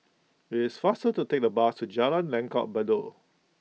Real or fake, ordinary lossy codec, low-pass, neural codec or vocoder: real; none; none; none